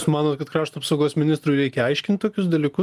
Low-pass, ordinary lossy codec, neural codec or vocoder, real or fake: 14.4 kHz; Opus, 32 kbps; none; real